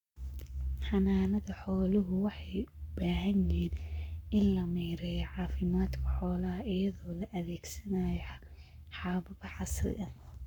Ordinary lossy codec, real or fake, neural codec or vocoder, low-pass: none; fake; codec, 44.1 kHz, 7.8 kbps, Pupu-Codec; 19.8 kHz